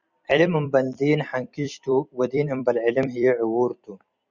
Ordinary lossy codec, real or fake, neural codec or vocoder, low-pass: Opus, 64 kbps; fake; vocoder, 44.1 kHz, 128 mel bands every 256 samples, BigVGAN v2; 7.2 kHz